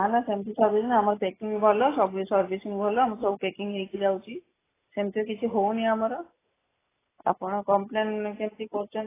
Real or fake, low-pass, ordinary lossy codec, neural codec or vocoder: real; 3.6 kHz; AAC, 16 kbps; none